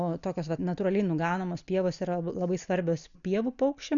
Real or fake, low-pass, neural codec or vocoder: real; 7.2 kHz; none